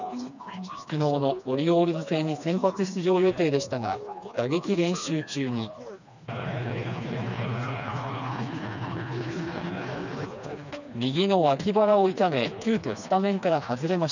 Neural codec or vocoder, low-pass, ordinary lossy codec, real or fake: codec, 16 kHz, 2 kbps, FreqCodec, smaller model; 7.2 kHz; none; fake